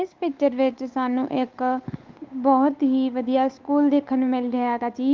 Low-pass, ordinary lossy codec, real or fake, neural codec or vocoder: 7.2 kHz; Opus, 24 kbps; fake; codec, 16 kHz, 8 kbps, FunCodec, trained on Chinese and English, 25 frames a second